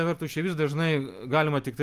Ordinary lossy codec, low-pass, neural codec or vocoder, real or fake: Opus, 32 kbps; 14.4 kHz; none; real